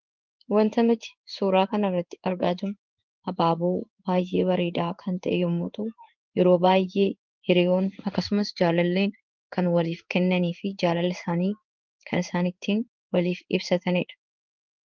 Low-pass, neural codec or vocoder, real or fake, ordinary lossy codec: 7.2 kHz; codec, 16 kHz in and 24 kHz out, 1 kbps, XY-Tokenizer; fake; Opus, 32 kbps